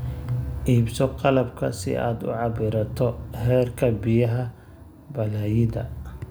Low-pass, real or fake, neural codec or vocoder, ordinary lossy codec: none; real; none; none